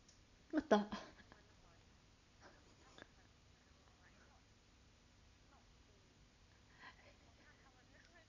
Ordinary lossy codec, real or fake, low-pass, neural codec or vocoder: none; real; 7.2 kHz; none